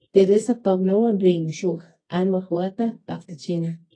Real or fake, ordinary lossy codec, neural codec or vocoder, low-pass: fake; AAC, 32 kbps; codec, 24 kHz, 0.9 kbps, WavTokenizer, medium music audio release; 9.9 kHz